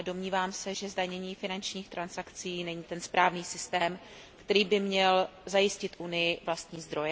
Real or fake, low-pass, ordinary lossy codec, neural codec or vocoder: real; none; none; none